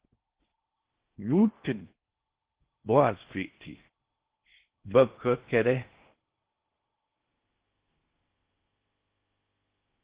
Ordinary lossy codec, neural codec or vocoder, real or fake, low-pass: Opus, 16 kbps; codec, 16 kHz in and 24 kHz out, 0.6 kbps, FocalCodec, streaming, 4096 codes; fake; 3.6 kHz